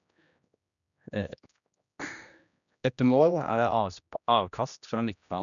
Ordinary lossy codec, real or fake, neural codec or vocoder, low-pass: none; fake; codec, 16 kHz, 1 kbps, X-Codec, HuBERT features, trained on general audio; 7.2 kHz